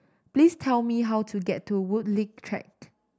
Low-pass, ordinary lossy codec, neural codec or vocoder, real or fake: none; none; none; real